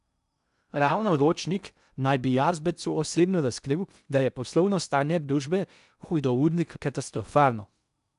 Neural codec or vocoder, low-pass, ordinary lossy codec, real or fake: codec, 16 kHz in and 24 kHz out, 0.6 kbps, FocalCodec, streaming, 2048 codes; 10.8 kHz; none; fake